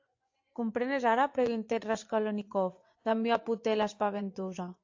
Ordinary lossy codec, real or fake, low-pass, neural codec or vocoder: AAC, 48 kbps; fake; 7.2 kHz; vocoder, 24 kHz, 100 mel bands, Vocos